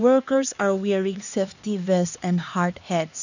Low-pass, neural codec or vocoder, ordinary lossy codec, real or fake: 7.2 kHz; codec, 16 kHz, 2 kbps, X-Codec, HuBERT features, trained on LibriSpeech; none; fake